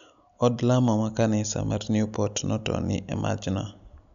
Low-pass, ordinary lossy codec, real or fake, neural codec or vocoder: 7.2 kHz; none; real; none